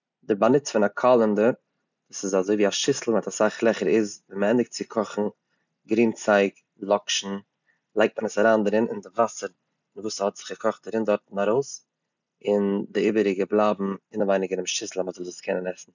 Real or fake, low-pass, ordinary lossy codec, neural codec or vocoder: real; 7.2 kHz; none; none